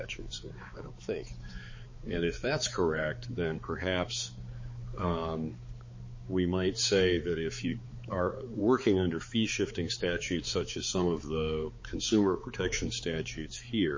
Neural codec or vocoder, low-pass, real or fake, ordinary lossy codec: codec, 16 kHz, 4 kbps, X-Codec, HuBERT features, trained on balanced general audio; 7.2 kHz; fake; MP3, 32 kbps